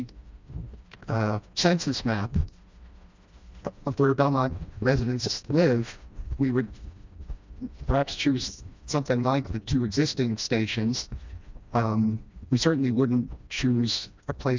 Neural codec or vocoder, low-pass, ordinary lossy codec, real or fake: codec, 16 kHz, 1 kbps, FreqCodec, smaller model; 7.2 kHz; MP3, 64 kbps; fake